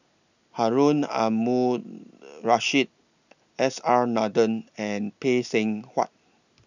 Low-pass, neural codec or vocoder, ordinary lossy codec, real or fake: 7.2 kHz; none; none; real